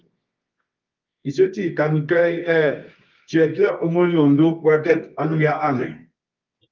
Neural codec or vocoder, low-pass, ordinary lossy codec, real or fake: codec, 24 kHz, 0.9 kbps, WavTokenizer, medium music audio release; 7.2 kHz; Opus, 32 kbps; fake